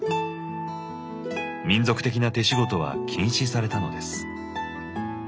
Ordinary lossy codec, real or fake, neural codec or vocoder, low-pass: none; real; none; none